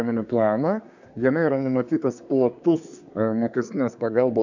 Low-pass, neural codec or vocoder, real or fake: 7.2 kHz; codec, 24 kHz, 1 kbps, SNAC; fake